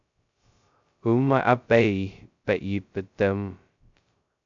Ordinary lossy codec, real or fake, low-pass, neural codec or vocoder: AAC, 64 kbps; fake; 7.2 kHz; codec, 16 kHz, 0.2 kbps, FocalCodec